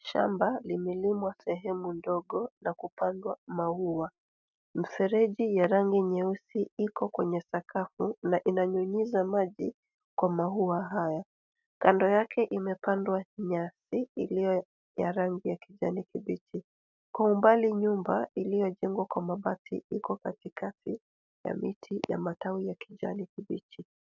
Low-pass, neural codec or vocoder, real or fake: 7.2 kHz; none; real